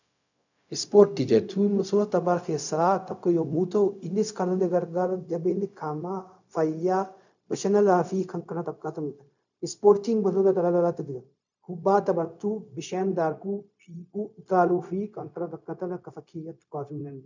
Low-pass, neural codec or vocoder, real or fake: 7.2 kHz; codec, 16 kHz, 0.4 kbps, LongCat-Audio-Codec; fake